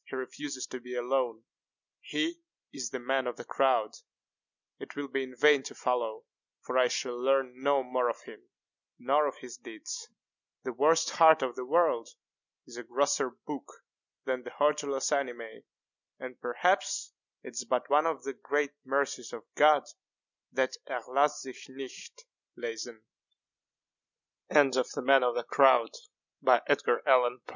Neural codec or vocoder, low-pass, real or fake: none; 7.2 kHz; real